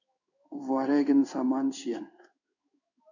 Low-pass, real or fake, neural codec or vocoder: 7.2 kHz; fake; codec, 16 kHz in and 24 kHz out, 1 kbps, XY-Tokenizer